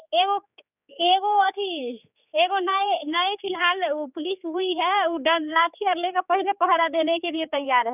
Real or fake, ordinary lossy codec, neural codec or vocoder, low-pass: fake; none; codec, 16 kHz, 4 kbps, X-Codec, HuBERT features, trained on general audio; 3.6 kHz